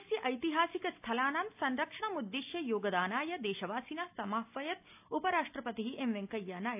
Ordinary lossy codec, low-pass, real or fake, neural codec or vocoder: AAC, 32 kbps; 3.6 kHz; real; none